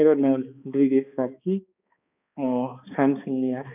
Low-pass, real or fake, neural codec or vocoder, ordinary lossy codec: 3.6 kHz; fake; codec, 16 kHz, 2 kbps, X-Codec, HuBERT features, trained on balanced general audio; none